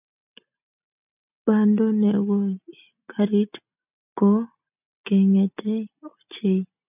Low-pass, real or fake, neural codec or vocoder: 3.6 kHz; real; none